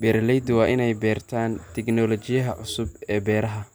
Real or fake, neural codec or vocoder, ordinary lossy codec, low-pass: real; none; none; none